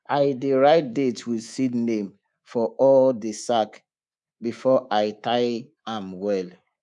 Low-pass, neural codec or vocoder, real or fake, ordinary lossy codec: 10.8 kHz; codec, 24 kHz, 3.1 kbps, DualCodec; fake; none